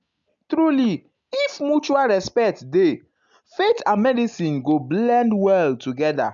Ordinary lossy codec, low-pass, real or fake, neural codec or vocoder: MP3, 64 kbps; 7.2 kHz; real; none